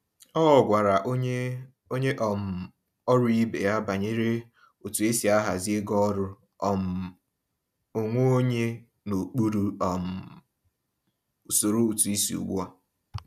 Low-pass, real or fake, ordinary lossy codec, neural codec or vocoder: 14.4 kHz; real; none; none